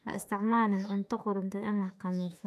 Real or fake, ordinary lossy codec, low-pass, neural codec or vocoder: fake; AAC, 96 kbps; 14.4 kHz; autoencoder, 48 kHz, 32 numbers a frame, DAC-VAE, trained on Japanese speech